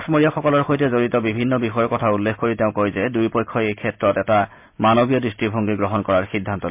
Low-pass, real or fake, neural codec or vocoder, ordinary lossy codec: 3.6 kHz; real; none; MP3, 32 kbps